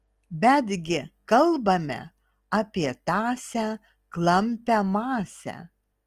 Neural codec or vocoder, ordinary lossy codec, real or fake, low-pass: none; Opus, 24 kbps; real; 14.4 kHz